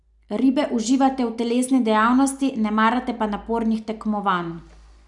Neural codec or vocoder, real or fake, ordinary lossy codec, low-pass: none; real; none; 10.8 kHz